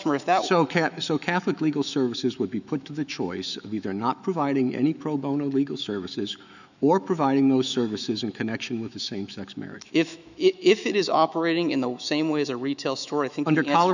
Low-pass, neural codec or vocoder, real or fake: 7.2 kHz; autoencoder, 48 kHz, 128 numbers a frame, DAC-VAE, trained on Japanese speech; fake